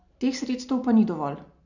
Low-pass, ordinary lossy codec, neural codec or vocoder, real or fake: 7.2 kHz; none; none; real